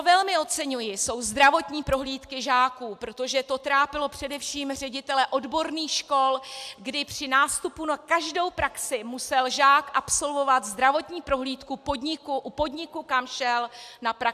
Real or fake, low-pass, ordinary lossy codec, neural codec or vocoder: real; 14.4 kHz; AAC, 96 kbps; none